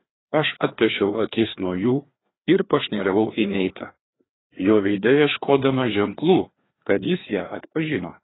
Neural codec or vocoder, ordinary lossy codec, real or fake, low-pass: codec, 16 kHz, 2 kbps, FreqCodec, larger model; AAC, 16 kbps; fake; 7.2 kHz